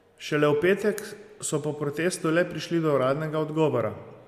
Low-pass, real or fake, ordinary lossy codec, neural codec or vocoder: 14.4 kHz; real; AAC, 96 kbps; none